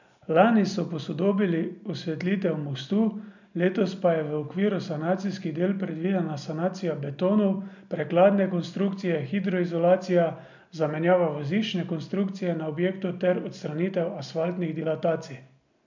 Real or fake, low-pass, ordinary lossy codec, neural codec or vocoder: real; 7.2 kHz; none; none